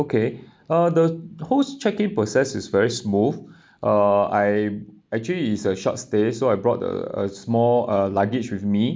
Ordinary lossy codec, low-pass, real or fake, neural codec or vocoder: none; none; real; none